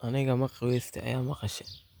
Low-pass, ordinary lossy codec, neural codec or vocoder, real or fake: none; none; none; real